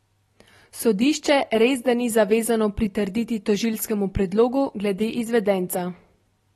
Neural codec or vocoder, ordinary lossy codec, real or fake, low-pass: none; AAC, 32 kbps; real; 19.8 kHz